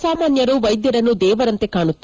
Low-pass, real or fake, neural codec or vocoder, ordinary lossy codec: 7.2 kHz; real; none; Opus, 24 kbps